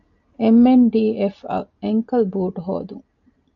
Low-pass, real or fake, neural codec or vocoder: 7.2 kHz; real; none